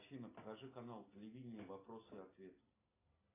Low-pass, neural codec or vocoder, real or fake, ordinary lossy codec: 3.6 kHz; none; real; AAC, 16 kbps